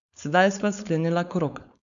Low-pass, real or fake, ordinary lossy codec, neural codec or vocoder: 7.2 kHz; fake; none; codec, 16 kHz, 4.8 kbps, FACodec